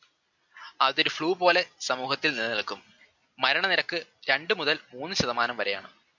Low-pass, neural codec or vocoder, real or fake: 7.2 kHz; none; real